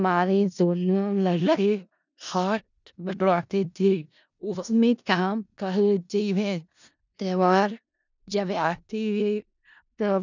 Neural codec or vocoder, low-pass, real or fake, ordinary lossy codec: codec, 16 kHz in and 24 kHz out, 0.4 kbps, LongCat-Audio-Codec, four codebook decoder; 7.2 kHz; fake; none